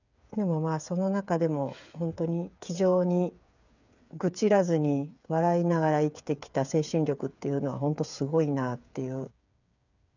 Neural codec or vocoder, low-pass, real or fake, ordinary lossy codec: codec, 16 kHz, 8 kbps, FreqCodec, smaller model; 7.2 kHz; fake; none